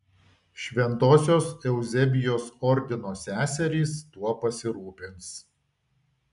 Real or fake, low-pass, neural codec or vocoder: real; 10.8 kHz; none